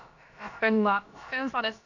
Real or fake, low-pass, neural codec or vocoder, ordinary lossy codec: fake; 7.2 kHz; codec, 16 kHz, about 1 kbps, DyCAST, with the encoder's durations; none